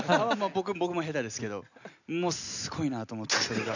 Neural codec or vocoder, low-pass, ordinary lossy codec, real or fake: none; 7.2 kHz; none; real